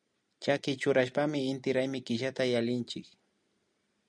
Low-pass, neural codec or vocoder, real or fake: 9.9 kHz; none; real